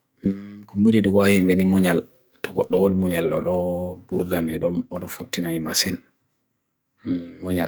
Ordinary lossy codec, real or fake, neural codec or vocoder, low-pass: none; fake; codec, 44.1 kHz, 2.6 kbps, SNAC; none